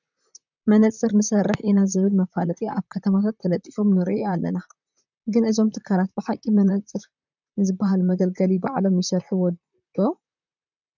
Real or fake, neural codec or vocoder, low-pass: fake; vocoder, 44.1 kHz, 128 mel bands, Pupu-Vocoder; 7.2 kHz